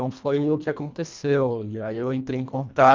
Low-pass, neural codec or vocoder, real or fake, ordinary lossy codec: 7.2 kHz; codec, 24 kHz, 1.5 kbps, HILCodec; fake; MP3, 64 kbps